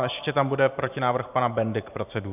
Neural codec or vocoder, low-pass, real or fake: vocoder, 44.1 kHz, 128 mel bands every 512 samples, BigVGAN v2; 3.6 kHz; fake